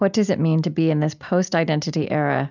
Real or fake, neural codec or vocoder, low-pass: real; none; 7.2 kHz